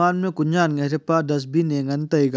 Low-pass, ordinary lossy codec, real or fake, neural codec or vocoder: none; none; real; none